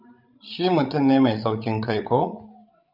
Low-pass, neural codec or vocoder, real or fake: 5.4 kHz; codec, 16 kHz, 16 kbps, FreqCodec, larger model; fake